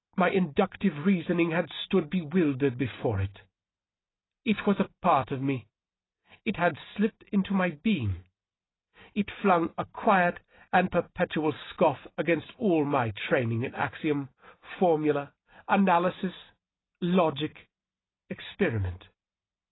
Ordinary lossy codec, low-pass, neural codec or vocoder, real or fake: AAC, 16 kbps; 7.2 kHz; none; real